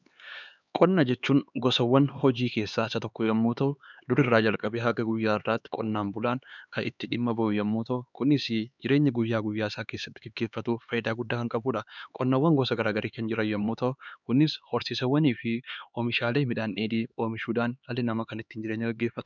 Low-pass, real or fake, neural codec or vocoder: 7.2 kHz; fake; codec, 16 kHz, 4 kbps, X-Codec, HuBERT features, trained on LibriSpeech